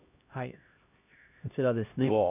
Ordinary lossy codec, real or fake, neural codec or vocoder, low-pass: none; fake; codec, 16 kHz, 1 kbps, X-Codec, HuBERT features, trained on LibriSpeech; 3.6 kHz